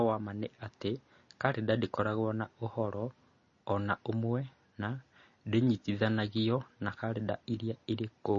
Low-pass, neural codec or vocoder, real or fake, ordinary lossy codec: 7.2 kHz; none; real; MP3, 32 kbps